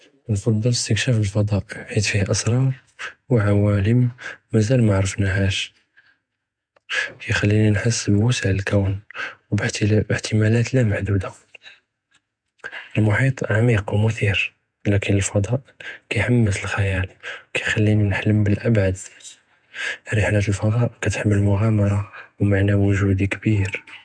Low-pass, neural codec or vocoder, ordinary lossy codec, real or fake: 9.9 kHz; vocoder, 48 kHz, 128 mel bands, Vocos; MP3, 96 kbps; fake